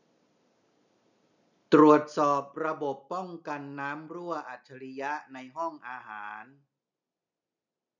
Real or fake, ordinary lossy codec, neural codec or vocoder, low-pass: real; none; none; 7.2 kHz